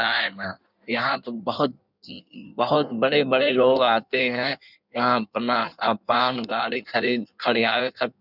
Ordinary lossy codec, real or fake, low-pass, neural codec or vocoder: none; fake; 5.4 kHz; codec, 16 kHz in and 24 kHz out, 1.1 kbps, FireRedTTS-2 codec